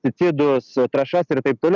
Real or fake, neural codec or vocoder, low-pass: real; none; 7.2 kHz